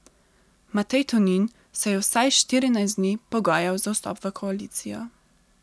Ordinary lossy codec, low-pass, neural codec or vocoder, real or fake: none; none; none; real